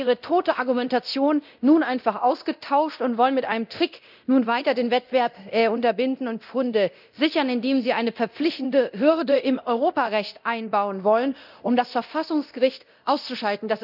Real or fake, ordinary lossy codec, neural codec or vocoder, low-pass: fake; none; codec, 24 kHz, 0.9 kbps, DualCodec; 5.4 kHz